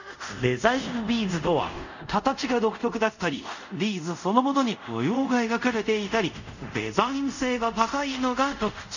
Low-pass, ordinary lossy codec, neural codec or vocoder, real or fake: 7.2 kHz; none; codec, 24 kHz, 0.5 kbps, DualCodec; fake